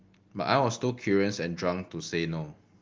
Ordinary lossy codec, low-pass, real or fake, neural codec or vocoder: Opus, 32 kbps; 7.2 kHz; real; none